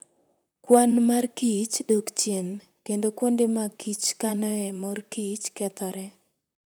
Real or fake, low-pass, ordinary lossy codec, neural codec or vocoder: fake; none; none; vocoder, 44.1 kHz, 128 mel bands, Pupu-Vocoder